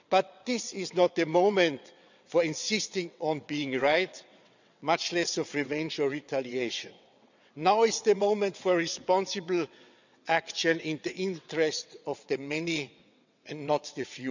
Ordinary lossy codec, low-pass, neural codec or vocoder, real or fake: none; 7.2 kHz; vocoder, 22.05 kHz, 80 mel bands, WaveNeXt; fake